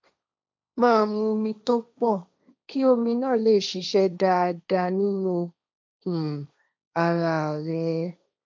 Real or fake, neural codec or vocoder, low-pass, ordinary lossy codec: fake; codec, 16 kHz, 1.1 kbps, Voila-Tokenizer; none; none